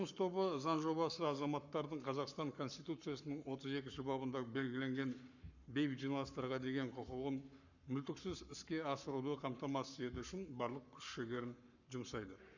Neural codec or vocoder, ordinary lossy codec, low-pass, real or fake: codec, 44.1 kHz, 7.8 kbps, Pupu-Codec; none; 7.2 kHz; fake